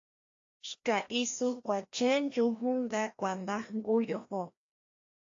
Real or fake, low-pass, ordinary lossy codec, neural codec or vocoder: fake; 7.2 kHz; AAC, 48 kbps; codec, 16 kHz, 1 kbps, FreqCodec, larger model